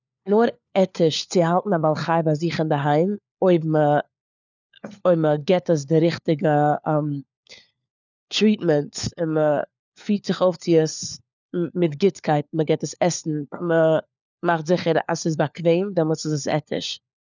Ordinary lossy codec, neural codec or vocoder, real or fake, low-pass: none; codec, 16 kHz, 4 kbps, FunCodec, trained on LibriTTS, 50 frames a second; fake; 7.2 kHz